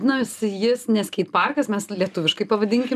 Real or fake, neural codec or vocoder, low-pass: real; none; 14.4 kHz